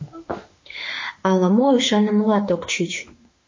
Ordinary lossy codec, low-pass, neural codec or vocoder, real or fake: MP3, 32 kbps; 7.2 kHz; autoencoder, 48 kHz, 32 numbers a frame, DAC-VAE, trained on Japanese speech; fake